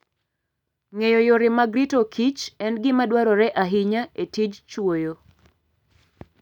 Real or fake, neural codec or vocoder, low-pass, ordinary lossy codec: real; none; 19.8 kHz; none